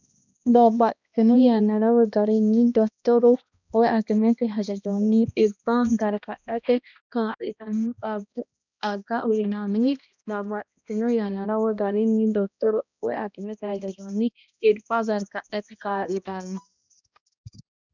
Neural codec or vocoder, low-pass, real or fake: codec, 16 kHz, 1 kbps, X-Codec, HuBERT features, trained on balanced general audio; 7.2 kHz; fake